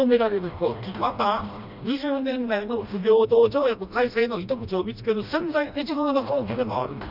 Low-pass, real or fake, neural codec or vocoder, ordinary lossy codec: 5.4 kHz; fake; codec, 16 kHz, 1 kbps, FreqCodec, smaller model; none